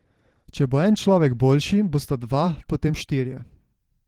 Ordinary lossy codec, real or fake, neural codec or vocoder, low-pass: Opus, 16 kbps; fake; vocoder, 44.1 kHz, 128 mel bands every 512 samples, BigVGAN v2; 19.8 kHz